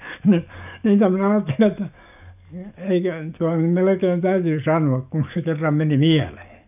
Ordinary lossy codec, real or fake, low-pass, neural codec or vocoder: none; fake; 3.6 kHz; codec, 44.1 kHz, 7.8 kbps, DAC